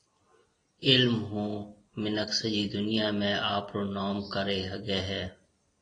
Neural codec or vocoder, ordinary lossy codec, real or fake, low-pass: none; AAC, 32 kbps; real; 9.9 kHz